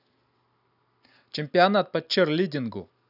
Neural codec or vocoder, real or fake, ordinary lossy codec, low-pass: none; real; none; 5.4 kHz